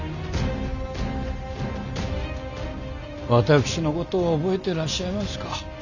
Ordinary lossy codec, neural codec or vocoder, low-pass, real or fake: none; none; 7.2 kHz; real